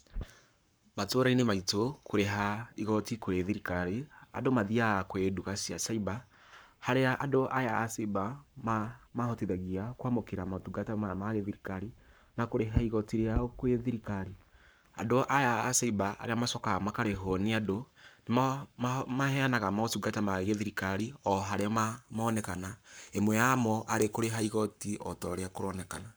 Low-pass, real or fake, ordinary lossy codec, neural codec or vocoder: none; fake; none; codec, 44.1 kHz, 7.8 kbps, Pupu-Codec